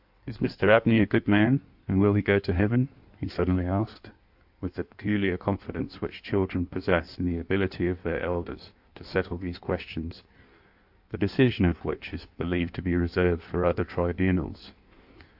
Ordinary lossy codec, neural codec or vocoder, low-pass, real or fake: AAC, 48 kbps; codec, 16 kHz in and 24 kHz out, 1.1 kbps, FireRedTTS-2 codec; 5.4 kHz; fake